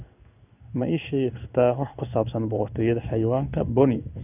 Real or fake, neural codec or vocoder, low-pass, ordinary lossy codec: fake; codec, 16 kHz in and 24 kHz out, 1 kbps, XY-Tokenizer; 3.6 kHz; none